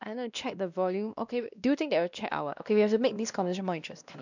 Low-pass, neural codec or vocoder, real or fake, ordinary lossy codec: 7.2 kHz; codec, 16 kHz, 1 kbps, X-Codec, WavLM features, trained on Multilingual LibriSpeech; fake; none